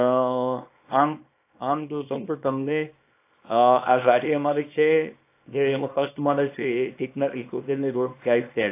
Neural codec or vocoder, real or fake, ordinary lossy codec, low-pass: codec, 24 kHz, 0.9 kbps, WavTokenizer, small release; fake; AAC, 24 kbps; 3.6 kHz